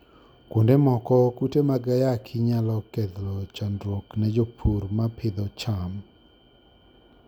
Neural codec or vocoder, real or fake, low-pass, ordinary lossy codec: none; real; 19.8 kHz; none